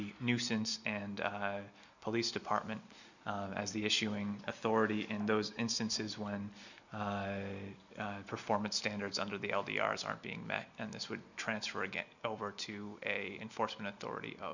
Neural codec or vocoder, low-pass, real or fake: none; 7.2 kHz; real